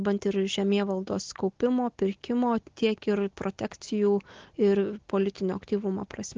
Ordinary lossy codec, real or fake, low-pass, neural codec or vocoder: Opus, 16 kbps; real; 7.2 kHz; none